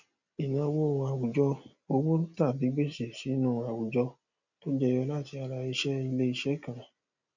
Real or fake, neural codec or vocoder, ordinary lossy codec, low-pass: real; none; none; 7.2 kHz